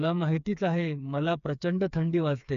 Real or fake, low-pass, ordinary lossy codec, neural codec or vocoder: fake; 7.2 kHz; none; codec, 16 kHz, 4 kbps, FreqCodec, smaller model